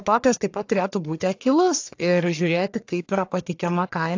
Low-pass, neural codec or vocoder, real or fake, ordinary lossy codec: 7.2 kHz; codec, 44.1 kHz, 1.7 kbps, Pupu-Codec; fake; AAC, 48 kbps